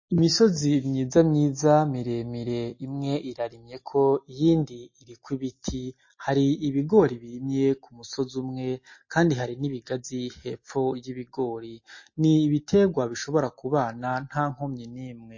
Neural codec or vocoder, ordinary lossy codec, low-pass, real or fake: none; MP3, 32 kbps; 7.2 kHz; real